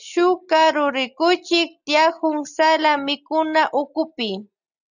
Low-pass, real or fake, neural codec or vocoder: 7.2 kHz; real; none